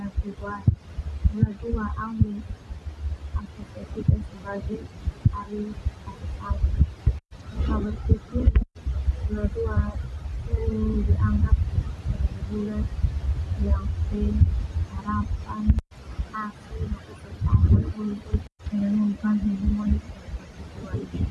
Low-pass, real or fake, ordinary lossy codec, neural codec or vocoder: none; real; none; none